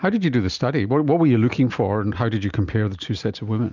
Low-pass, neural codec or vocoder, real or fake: 7.2 kHz; none; real